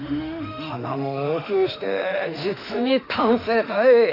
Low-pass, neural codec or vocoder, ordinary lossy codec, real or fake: 5.4 kHz; autoencoder, 48 kHz, 32 numbers a frame, DAC-VAE, trained on Japanese speech; none; fake